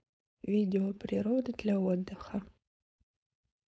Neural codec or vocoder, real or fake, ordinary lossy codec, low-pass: codec, 16 kHz, 4.8 kbps, FACodec; fake; none; none